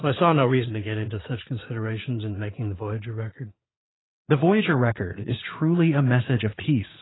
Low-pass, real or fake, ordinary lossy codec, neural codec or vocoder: 7.2 kHz; fake; AAC, 16 kbps; vocoder, 22.05 kHz, 80 mel bands, Vocos